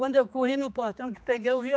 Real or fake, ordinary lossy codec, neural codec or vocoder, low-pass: fake; none; codec, 16 kHz, 2 kbps, X-Codec, HuBERT features, trained on general audio; none